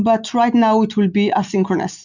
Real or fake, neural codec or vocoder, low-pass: real; none; 7.2 kHz